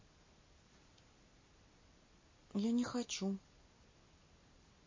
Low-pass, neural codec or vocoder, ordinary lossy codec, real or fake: 7.2 kHz; none; MP3, 32 kbps; real